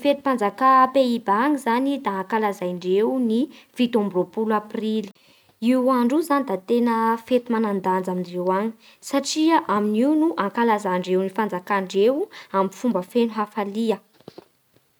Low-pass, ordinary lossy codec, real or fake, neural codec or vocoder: none; none; real; none